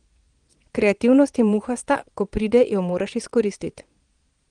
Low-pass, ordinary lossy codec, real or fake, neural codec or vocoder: 9.9 kHz; Opus, 24 kbps; fake; vocoder, 22.05 kHz, 80 mel bands, Vocos